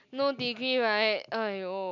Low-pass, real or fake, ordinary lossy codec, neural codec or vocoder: 7.2 kHz; real; none; none